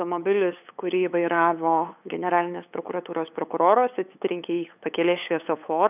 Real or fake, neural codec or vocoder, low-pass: fake; codec, 16 kHz, 8 kbps, FunCodec, trained on LibriTTS, 25 frames a second; 3.6 kHz